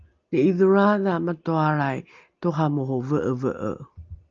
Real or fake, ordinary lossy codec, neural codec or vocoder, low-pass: real; Opus, 24 kbps; none; 7.2 kHz